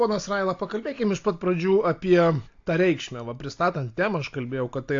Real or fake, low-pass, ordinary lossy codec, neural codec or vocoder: real; 7.2 kHz; AAC, 48 kbps; none